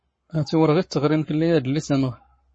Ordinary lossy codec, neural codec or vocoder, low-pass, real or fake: MP3, 32 kbps; codec, 44.1 kHz, 7.8 kbps, Pupu-Codec; 9.9 kHz; fake